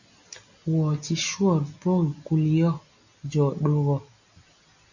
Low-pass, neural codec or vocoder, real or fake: 7.2 kHz; none; real